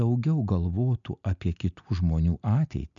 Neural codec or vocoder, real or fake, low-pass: none; real; 7.2 kHz